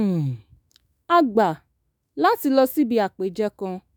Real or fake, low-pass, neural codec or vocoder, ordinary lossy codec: fake; none; autoencoder, 48 kHz, 128 numbers a frame, DAC-VAE, trained on Japanese speech; none